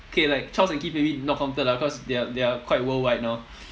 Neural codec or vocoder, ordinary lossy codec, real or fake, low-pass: none; none; real; none